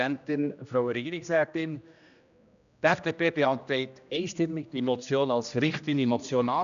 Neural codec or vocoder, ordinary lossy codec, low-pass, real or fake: codec, 16 kHz, 1 kbps, X-Codec, HuBERT features, trained on general audio; none; 7.2 kHz; fake